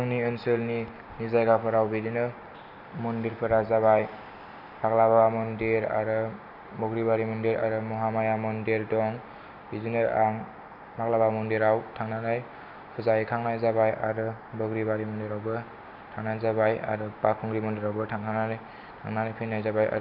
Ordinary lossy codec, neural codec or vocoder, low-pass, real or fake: Opus, 64 kbps; none; 5.4 kHz; real